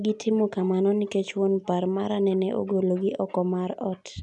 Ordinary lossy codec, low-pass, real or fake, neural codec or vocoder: none; 10.8 kHz; real; none